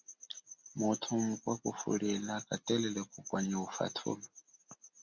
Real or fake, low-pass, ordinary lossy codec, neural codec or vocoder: real; 7.2 kHz; Opus, 64 kbps; none